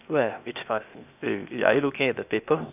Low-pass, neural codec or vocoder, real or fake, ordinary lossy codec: 3.6 kHz; codec, 16 kHz, 0.8 kbps, ZipCodec; fake; none